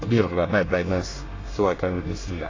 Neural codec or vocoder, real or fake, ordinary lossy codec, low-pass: codec, 24 kHz, 1 kbps, SNAC; fake; AAC, 32 kbps; 7.2 kHz